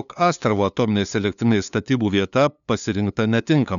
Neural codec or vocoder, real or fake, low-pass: codec, 16 kHz, 2 kbps, FunCodec, trained on LibriTTS, 25 frames a second; fake; 7.2 kHz